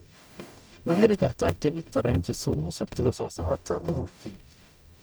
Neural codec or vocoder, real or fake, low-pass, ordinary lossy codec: codec, 44.1 kHz, 0.9 kbps, DAC; fake; none; none